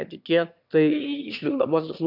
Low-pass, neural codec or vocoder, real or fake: 5.4 kHz; autoencoder, 22.05 kHz, a latent of 192 numbers a frame, VITS, trained on one speaker; fake